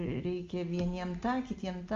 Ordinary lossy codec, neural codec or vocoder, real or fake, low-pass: Opus, 32 kbps; none; real; 7.2 kHz